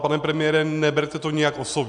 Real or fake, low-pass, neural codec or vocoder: real; 9.9 kHz; none